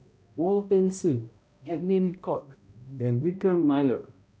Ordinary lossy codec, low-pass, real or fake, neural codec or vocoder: none; none; fake; codec, 16 kHz, 0.5 kbps, X-Codec, HuBERT features, trained on balanced general audio